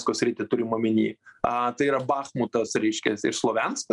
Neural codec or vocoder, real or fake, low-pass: none; real; 10.8 kHz